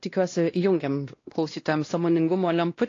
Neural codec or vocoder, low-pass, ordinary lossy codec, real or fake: codec, 16 kHz, 1 kbps, X-Codec, WavLM features, trained on Multilingual LibriSpeech; 7.2 kHz; AAC, 32 kbps; fake